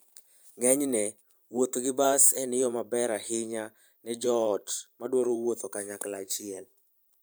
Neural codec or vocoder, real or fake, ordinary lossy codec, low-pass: vocoder, 44.1 kHz, 128 mel bands every 512 samples, BigVGAN v2; fake; none; none